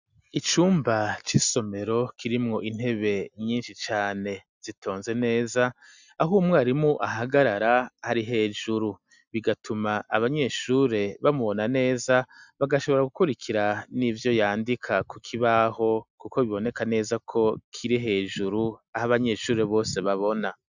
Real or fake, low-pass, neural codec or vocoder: real; 7.2 kHz; none